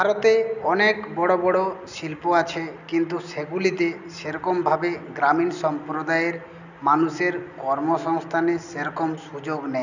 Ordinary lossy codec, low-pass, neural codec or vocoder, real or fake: none; 7.2 kHz; none; real